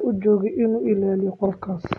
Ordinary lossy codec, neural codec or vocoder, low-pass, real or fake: AAC, 32 kbps; none; 10.8 kHz; real